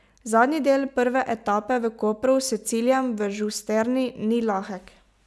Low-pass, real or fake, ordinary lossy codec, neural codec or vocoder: none; real; none; none